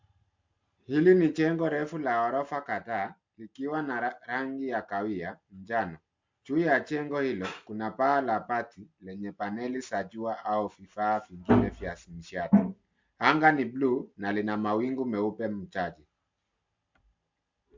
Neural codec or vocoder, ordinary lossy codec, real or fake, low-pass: none; MP3, 64 kbps; real; 7.2 kHz